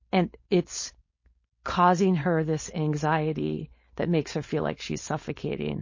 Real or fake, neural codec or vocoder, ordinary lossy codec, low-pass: fake; codec, 16 kHz, 4.8 kbps, FACodec; MP3, 32 kbps; 7.2 kHz